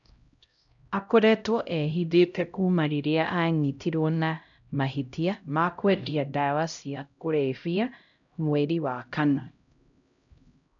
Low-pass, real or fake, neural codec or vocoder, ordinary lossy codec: 7.2 kHz; fake; codec, 16 kHz, 0.5 kbps, X-Codec, HuBERT features, trained on LibriSpeech; none